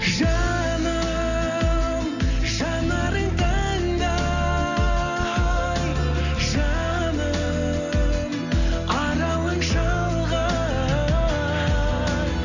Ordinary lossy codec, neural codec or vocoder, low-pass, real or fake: none; none; 7.2 kHz; real